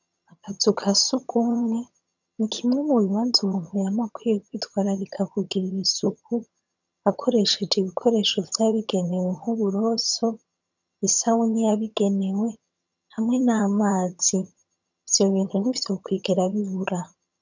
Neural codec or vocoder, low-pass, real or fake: vocoder, 22.05 kHz, 80 mel bands, HiFi-GAN; 7.2 kHz; fake